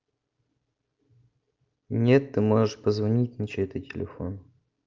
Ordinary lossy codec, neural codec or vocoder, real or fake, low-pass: Opus, 32 kbps; none; real; 7.2 kHz